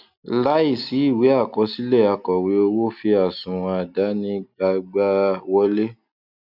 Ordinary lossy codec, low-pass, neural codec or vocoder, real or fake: none; 5.4 kHz; none; real